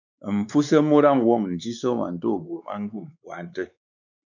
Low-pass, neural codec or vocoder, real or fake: 7.2 kHz; codec, 16 kHz, 4 kbps, X-Codec, WavLM features, trained on Multilingual LibriSpeech; fake